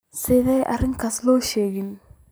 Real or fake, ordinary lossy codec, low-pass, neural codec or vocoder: real; none; none; none